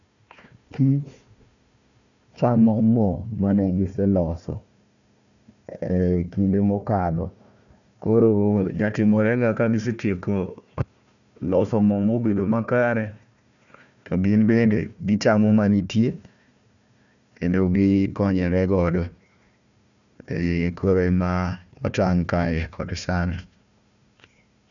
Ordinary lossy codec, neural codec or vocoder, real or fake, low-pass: none; codec, 16 kHz, 1 kbps, FunCodec, trained on Chinese and English, 50 frames a second; fake; 7.2 kHz